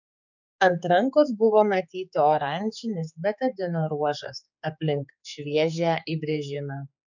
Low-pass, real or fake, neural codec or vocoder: 7.2 kHz; fake; codec, 16 kHz, 4 kbps, X-Codec, HuBERT features, trained on general audio